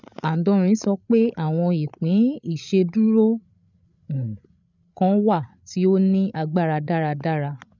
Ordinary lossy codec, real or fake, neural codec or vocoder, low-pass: none; fake; codec, 16 kHz, 8 kbps, FreqCodec, larger model; 7.2 kHz